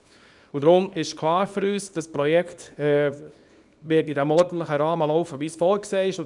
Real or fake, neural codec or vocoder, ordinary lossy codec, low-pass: fake; codec, 24 kHz, 0.9 kbps, WavTokenizer, small release; none; 10.8 kHz